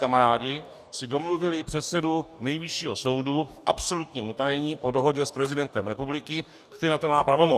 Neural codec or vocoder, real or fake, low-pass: codec, 44.1 kHz, 2.6 kbps, DAC; fake; 14.4 kHz